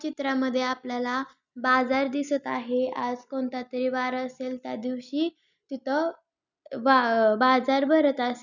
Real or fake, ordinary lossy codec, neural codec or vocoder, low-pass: real; none; none; 7.2 kHz